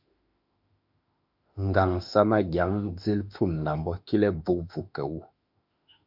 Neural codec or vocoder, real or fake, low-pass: autoencoder, 48 kHz, 32 numbers a frame, DAC-VAE, trained on Japanese speech; fake; 5.4 kHz